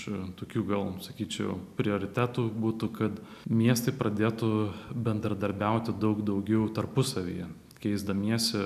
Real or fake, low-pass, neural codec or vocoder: real; 14.4 kHz; none